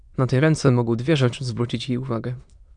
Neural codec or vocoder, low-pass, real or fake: autoencoder, 22.05 kHz, a latent of 192 numbers a frame, VITS, trained on many speakers; 9.9 kHz; fake